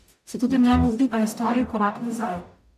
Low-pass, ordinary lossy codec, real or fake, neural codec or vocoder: 14.4 kHz; none; fake; codec, 44.1 kHz, 0.9 kbps, DAC